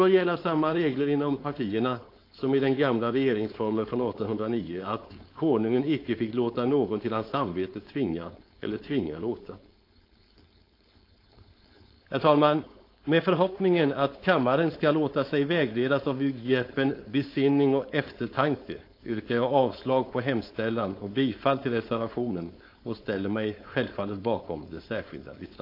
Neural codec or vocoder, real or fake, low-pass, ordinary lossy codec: codec, 16 kHz, 4.8 kbps, FACodec; fake; 5.4 kHz; AAC, 32 kbps